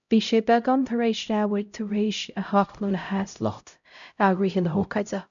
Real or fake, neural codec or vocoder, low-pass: fake; codec, 16 kHz, 0.5 kbps, X-Codec, HuBERT features, trained on LibriSpeech; 7.2 kHz